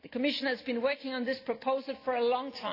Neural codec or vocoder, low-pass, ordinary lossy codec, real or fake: none; 5.4 kHz; AAC, 32 kbps; real